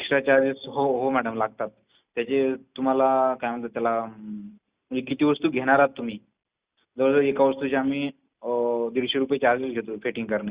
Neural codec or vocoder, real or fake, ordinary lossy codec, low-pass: none; real; Opus, 64 kbps; 3.6 kHz